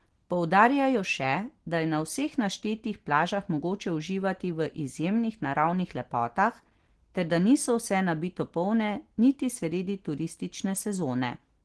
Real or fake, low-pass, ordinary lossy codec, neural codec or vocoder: real; 10.8 kHz; Opus, 16 kbps; none